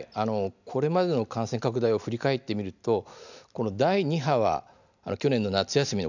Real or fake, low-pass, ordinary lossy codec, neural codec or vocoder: real; 7.2 kHz; none; none